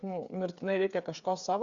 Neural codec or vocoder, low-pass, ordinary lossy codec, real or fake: codec, 16 kHz, 8 kbps, FreqCodec, smaller model; 7.2 kHz; Opus, 64 kbps; fake